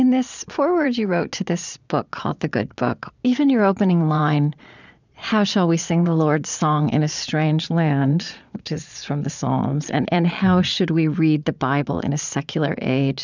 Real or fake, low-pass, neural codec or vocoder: fake; 7.2 kHz; vocoder, 22.05 kHz, 80 mel bands, Vocos